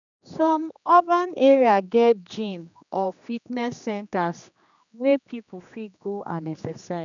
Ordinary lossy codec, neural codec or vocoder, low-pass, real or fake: none; codec, 16 kHz, 2 kbps, X-Codec, HuBERT features, trained on general audio; 7.2 kHz; fake